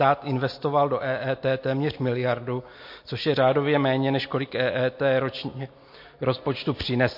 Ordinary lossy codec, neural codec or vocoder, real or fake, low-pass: MP3, 32 kbps; none; real; 5.4 kHz